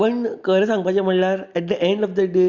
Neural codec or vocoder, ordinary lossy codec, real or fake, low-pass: none; Opus, 64 kbps; real; 7.2 kHz